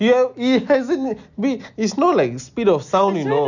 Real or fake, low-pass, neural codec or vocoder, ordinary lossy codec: real; 7.2 kHz; none; none